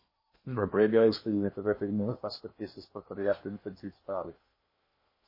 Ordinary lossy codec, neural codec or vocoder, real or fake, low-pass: MP3, 24 kbps; codec, 16 kHz in and 24 kHz out, 0.8 kbps, FocalCodec, streaming, 65536 codes; fake; 5.4 kHz